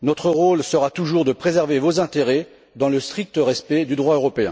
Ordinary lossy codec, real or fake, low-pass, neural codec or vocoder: none; real; none; none